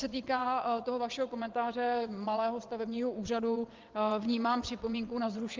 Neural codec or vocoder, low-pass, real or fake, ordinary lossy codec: vocoder, 22.05 kHz, 80 mel bands, WaveNeXt; 7.2 kHz; fake; Opus, 24 kbps